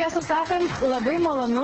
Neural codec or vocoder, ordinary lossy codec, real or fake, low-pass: codec, 16 kHz, 4 kbps, FreqCodec, smaller model; Opus, 16 kbps; fake; 7.2 kHz